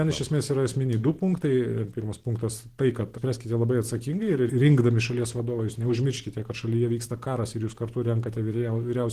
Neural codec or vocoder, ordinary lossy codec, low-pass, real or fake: vocoder, 44.1 kHz, 128 mel bands every 512 samples, BigVGAN v2; Opus, 16 kbps; 14.4 kHz; fake